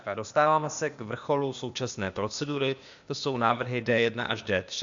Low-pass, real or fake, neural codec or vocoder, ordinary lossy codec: 7.2 kHz; fake; codec, 16 kHz, 0.8 kbps, ZipCodec; MP3, 64 kbps